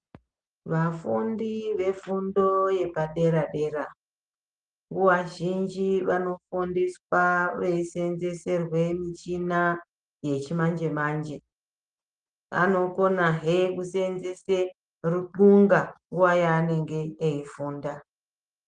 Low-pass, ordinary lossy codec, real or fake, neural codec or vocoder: 9.9 kHz; Opus, 24 kbps; real; none